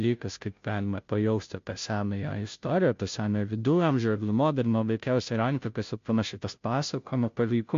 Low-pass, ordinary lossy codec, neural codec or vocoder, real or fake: 7.2 kHz; MP3, 48 kbps; codec, 16 kHz, 0.5 kbps, FunCodec, trained on Chinese and English, 25 frames a second; fake